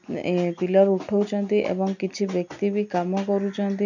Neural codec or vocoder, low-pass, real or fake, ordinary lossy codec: none; 7.2 kHz; real; none